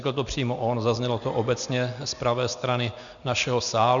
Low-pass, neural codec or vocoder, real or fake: 7.2 kHz; none; real